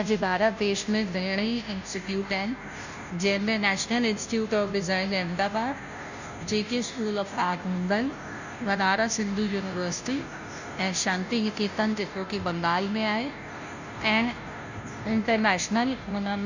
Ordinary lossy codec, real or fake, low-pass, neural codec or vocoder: none; fake; 7.2 kHz; codec, 16 kHz, 0.5 kbps, FunCodec, trained on Chinese and English, 25 frames a second